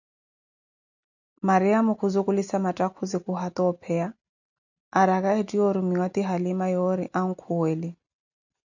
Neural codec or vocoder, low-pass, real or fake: none; 7.2 kHz; real